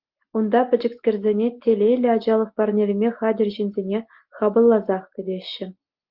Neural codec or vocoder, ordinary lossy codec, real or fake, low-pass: none; Opus, 32 kbps; real; 5.4 kHz